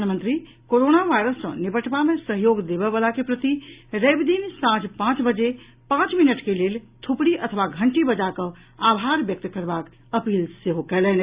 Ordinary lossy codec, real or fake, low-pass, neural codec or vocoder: Opus, 64 kbps; real; 3.6 kHz; none